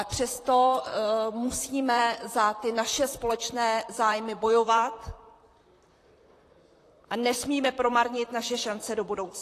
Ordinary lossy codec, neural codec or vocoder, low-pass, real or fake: AAC, 48 kbps; vocoder, 44.1 kHz, 128 mel bands, Pupu-Vocoder; 14.4 kHz; fake